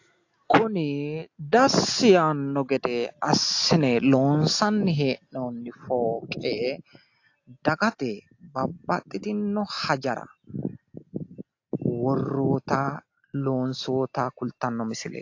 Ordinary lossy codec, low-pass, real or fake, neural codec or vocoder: AAC, 48 kbps; 7.2 kHz; real; none